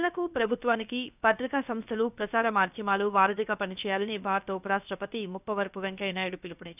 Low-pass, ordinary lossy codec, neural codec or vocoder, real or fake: 3.6 kHz; none; codec, 16 kHz, about 1 kbps, DyCAST, with the encoder's durations; fake